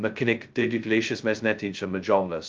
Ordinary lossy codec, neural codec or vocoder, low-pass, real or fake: Opus, 24 kbps; codec, 16 kHz, 0.2 kbps, FocalCodec; 7.2 kHz; fake